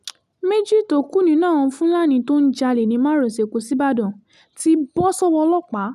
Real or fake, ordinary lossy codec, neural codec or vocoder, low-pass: real; none; none; 14.4 kHz